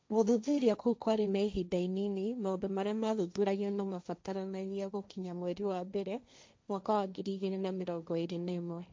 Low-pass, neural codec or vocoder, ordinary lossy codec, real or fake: none; codec, 16 kHz, 1.1 kbps, Voila-Tokenizer; none; fake